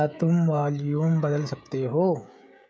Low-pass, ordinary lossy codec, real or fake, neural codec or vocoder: none; none; fake; codec, 16 kHz, 16 kbps, FreqCodec, smaller model